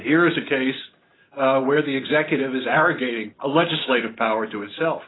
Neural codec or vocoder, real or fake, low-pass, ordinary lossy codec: none; real; 7.2 kHz; AAC, 16 kbps